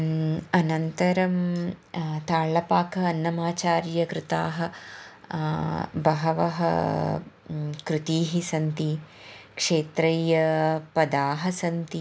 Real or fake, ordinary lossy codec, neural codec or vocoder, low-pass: real; none; none; none